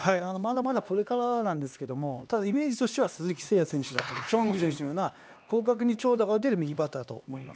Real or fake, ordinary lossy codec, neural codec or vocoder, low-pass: fake; none; codec, 16 kHz, 2 kbps, X-Codec, HuBERT features, trained on LibriSpeech; none